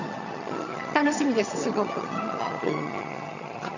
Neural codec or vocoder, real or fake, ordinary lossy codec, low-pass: vocoder, 22.05 kHz, 80 mel bands, HiFi-GAN; fake; none; 7.2 kHz